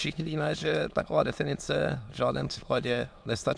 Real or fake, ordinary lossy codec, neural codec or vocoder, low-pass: fake; MP3, 96 kbps; autoencoder, 22.05 kHz, a latent of 192 numbers a frame, VITS, trained on many speakers; 9.9 kHz